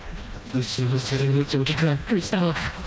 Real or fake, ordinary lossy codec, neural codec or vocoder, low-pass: fake; none; codec, 16 kHz, 1 kbps, FreqCodec, smaller model; none